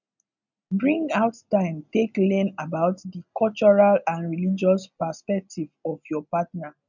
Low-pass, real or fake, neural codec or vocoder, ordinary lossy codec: 7.2 kHz; real; none; none